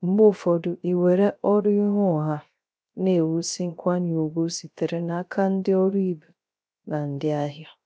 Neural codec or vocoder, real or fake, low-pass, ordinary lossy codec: codec, 16 kHz, 0.3 kbps, FocalCodec; fake; none; none